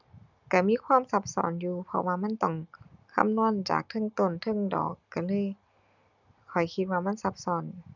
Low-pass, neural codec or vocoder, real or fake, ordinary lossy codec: 7.2 kHz; none; real; none